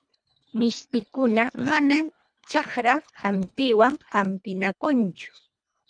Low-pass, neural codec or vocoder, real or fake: 9.9 kHz; codec, 24 kHz, 1.5 kbps, HILCodec; fake